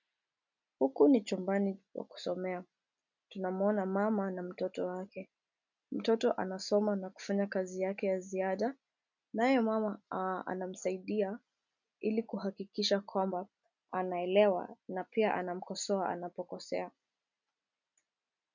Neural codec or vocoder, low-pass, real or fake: none; 7.2 kHz; real